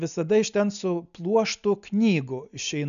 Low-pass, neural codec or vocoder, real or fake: 7.2 kHz; none; real